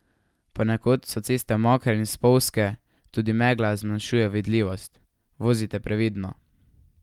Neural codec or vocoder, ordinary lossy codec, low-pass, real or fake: none; Opus, 32 kbps; 19.8 kHz; real